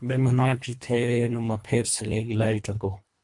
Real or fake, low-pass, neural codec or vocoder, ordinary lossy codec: fake; 10.8 kHz; codec, 24 kHz, 1.5 kbps, HILCodec; MP3, 64 kbps